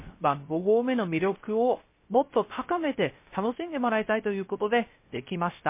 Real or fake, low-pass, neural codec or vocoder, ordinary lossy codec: fake; 3.6 kHz; codec, 16 kHz, 0.3 kbps, FocalCodec; MP3, 24 kbps